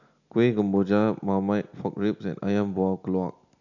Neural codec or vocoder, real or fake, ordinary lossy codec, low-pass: none; real; none; 7.2 kHz